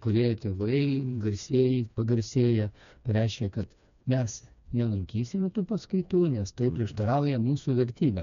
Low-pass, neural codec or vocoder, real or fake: 7.2 kHz; codec, 16 kHz, 2 kbps, FreqCodec, smaller model; fake